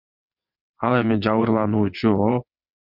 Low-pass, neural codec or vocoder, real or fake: 5.4 kHz; vocoder, 22.05 kHz, 80 mel bands, WaveNeXt; fake